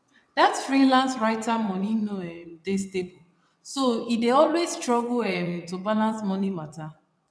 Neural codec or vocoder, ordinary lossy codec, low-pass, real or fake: vocoder, 22.05 kHz, 80 mel bands, WaveNeXt; none; none; fake